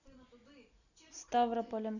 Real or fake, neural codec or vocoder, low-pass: real; none; 7.2 kHz